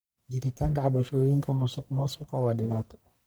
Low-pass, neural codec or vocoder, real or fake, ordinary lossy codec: none; codec, 44.1 kHz, 1.7 kbps, Pupu-Codec; fake; none